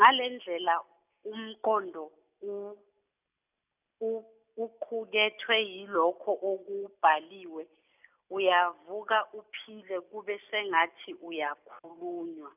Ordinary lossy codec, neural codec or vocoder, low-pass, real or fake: none; none; 3.6 kHz; real